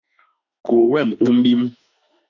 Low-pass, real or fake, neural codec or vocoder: 7.2 kHz; fake; autoencoder, 48 kHz, 32 numbers a frame, DAC-VAE, trained on Japanese speech